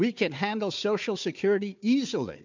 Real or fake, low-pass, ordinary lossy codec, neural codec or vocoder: fake; 7.2 kHz; MP3, 64 kbps; codec, 16 kHz, 4 kbps, FunCodec, trained on Chinese and English, 50 frames a second